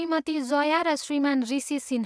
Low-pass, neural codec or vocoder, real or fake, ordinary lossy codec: none; vocoder, 22.05 kHz, 80 mel bands, WaveNeXt; fake; none